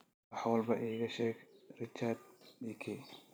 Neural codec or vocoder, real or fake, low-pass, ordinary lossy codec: vocoder, 44.1 kHz, 128 mel bands every 512 samples, BigVGAN v2; fake; none; none